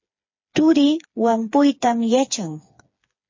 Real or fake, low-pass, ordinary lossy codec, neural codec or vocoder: fake; 7.2 kHz; MP3, 32 kbps; codec, 16 kHz, 4 kbps, FreqCodec, smaller model